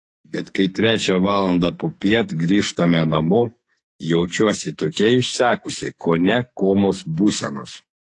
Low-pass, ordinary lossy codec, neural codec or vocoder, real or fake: 10.8 kHz; AAC, 48 kbps; codec, 44.1 kHz, 2.6 kbps, SNAC; fake